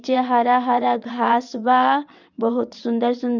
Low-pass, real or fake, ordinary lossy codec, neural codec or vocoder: 7.2 kHz; fake; none; vocoder, 22.05 kHz, 80 mel bands, WaveNeXt